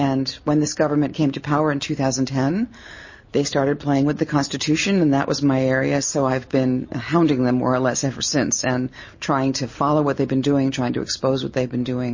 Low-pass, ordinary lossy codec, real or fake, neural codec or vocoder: 7.2 kHz; MP3, 32 kbps; real; none